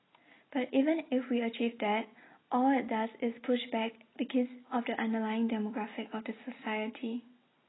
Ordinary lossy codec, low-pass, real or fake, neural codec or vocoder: AAC, 16 kbps; 7.2 kHz; real; none